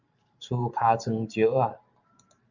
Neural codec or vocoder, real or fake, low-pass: vocoder, 44.1 kHz, 128 mel bands every 512 samples, BigVGAN v2; fake; 7.2 kHz